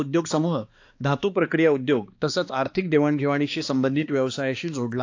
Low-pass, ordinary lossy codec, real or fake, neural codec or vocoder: 7.2 kHz; AAC, 48 kbps; fake; codec, 16 kHz, 2 kbps, X-Codec, HuBERT features, trained on balanced general audio